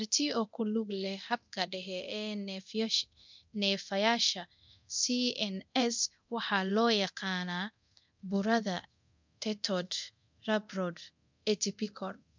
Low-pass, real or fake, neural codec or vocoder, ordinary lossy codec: 7.2 kHz; fake; codec, 24 kHz, 0.9 kbps, DualCodec; MP3, 64 kbps